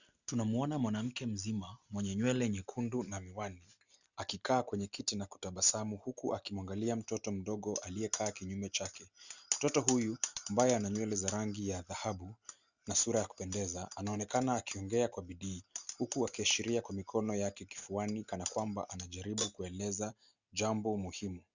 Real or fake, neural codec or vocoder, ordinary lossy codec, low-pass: real; none; Opus, 64 kbps; 7.2 kHz